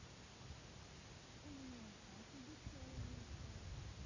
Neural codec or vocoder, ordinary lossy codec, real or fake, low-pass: none; Opus, 64 kbps; real; 7.2 kHz